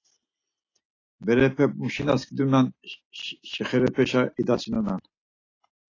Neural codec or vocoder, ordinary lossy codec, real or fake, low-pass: none; AAC, 32 kbps; real; 7.2 kHz